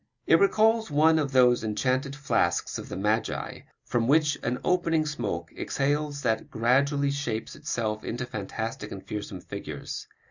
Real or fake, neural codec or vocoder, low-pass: real; none; 7.2 kHz